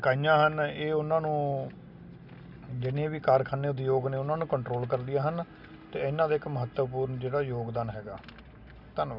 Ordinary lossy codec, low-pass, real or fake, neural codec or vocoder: none; 5.4 kHz; real; none